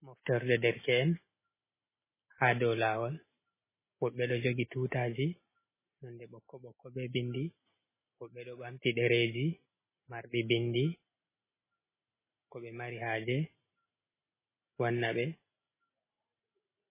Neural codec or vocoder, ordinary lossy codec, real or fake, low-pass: none; MP3, 16 kbps; real; 3.6 kHz